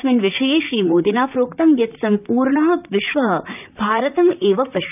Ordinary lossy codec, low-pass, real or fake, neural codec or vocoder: none; 3.6 kHz; fake; vocoder, 44.1 kHz, 128 mel bands, Pupu-Vocoder